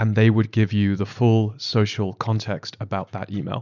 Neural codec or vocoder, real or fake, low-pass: none; real; 7.2 kHz